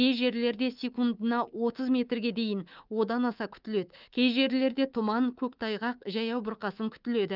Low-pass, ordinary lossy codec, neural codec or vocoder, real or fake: 5.4 kHz; Opus, 32 kbps; codec, 24 kHz, 3.1 kbps, DualCodec; fake